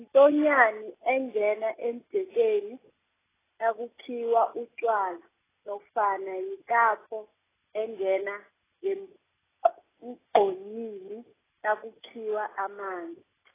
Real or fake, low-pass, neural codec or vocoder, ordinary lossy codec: real; 3.6 kHz; none; AAC, 16 kbps